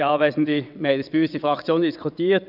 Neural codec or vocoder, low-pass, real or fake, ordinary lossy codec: vocoder, 22.05 kHz, 80 mel bands, WaveNeXt; 5.4 kHz; fake; none